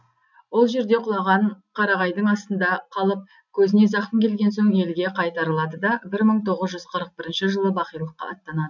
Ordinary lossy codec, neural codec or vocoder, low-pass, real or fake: none; none; 7.2 kHz; real